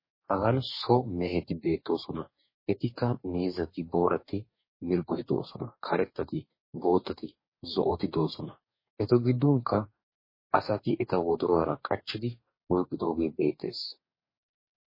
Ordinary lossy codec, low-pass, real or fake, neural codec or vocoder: MP3, 24 kbps; 5.4 kHz; fake; codec, 44.1 kHz, 2.6 kbps, DAC